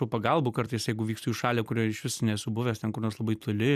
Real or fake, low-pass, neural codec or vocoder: real; 14.4 kHz; none